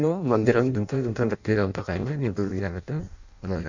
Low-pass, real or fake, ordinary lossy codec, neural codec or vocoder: 7.2 kHz; fake; none; codec, 16 kHz in and 24 kHz out, 0.6 kbps, FireRedTTS-2 codec